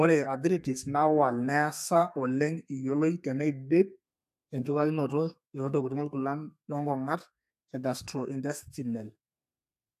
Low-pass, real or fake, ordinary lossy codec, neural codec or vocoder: 14.4 kHz; fake; AAC, 96 kbps; codec, 32 kHz, 1.9 kbps, SNAC